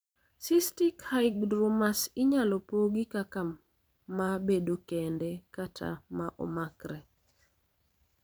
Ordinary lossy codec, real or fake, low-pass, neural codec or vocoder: none; fake; none; vocoder, 44.1 kHz, 128 mel bands every 512 samples, BigVGAN v2